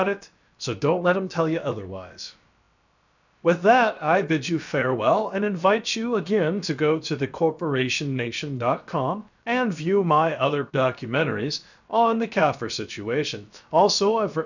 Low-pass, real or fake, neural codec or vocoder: 7.2 kHz; fake; codec, 16 kHz, about 1 kbps, DyCAST, with the encoder's durations